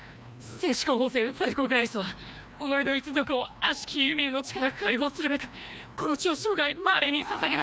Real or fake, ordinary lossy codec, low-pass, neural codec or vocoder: fake; none; none; codec, 16 kHz, 1 kbps, FreqCodec, larger model